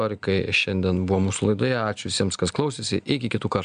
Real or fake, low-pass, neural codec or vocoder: real; 9.9 kHz; none